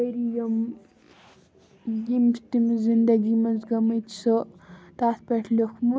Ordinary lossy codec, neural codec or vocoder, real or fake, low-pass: none; none; real; none